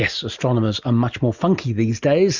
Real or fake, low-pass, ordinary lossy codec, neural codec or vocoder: real; 7.2 kHz; Opus, 64 kbps; none